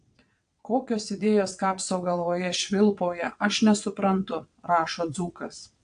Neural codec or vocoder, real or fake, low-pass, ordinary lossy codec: vocoder, 22.05 kHz, 80 mel bands, WaveNeXt; fake; 9.9 kHz; AAC, 64 kbps